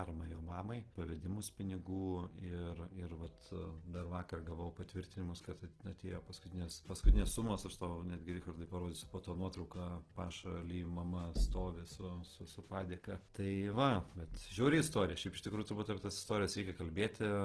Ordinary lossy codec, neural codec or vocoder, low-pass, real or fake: Opus, 16 kbps; none; 10.8 kHz; real